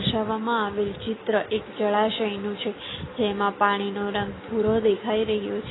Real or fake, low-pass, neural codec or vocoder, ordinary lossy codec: real; 7.2 kHz; none; AAC, 16 kbps